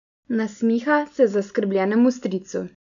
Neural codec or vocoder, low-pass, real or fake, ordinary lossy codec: none; 7.2 kHz; real; none